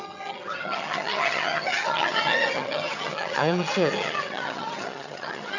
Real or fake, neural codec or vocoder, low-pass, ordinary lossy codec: fake; vocoder, 22.05 kHz, 80 mel bands, HiFi-GAN; 7.2 kHz; none